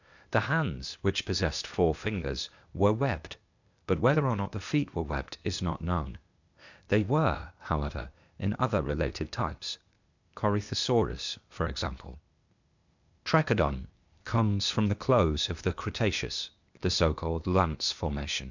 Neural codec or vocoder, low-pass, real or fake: codec, 16 kHz, 0.8 kbps, ZipCodec; 7.2 kHz; fake